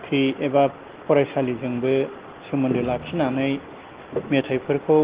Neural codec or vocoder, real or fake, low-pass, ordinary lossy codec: none; real; 3.6 kHz; Opus, 16 kbps